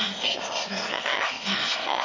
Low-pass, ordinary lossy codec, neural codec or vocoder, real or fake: 7.2 kHz; MP3, 32 kbps; autoencoder, 22.05 kHz, a latent of 192 numbers a frame, VITS, trained on one speaker; fake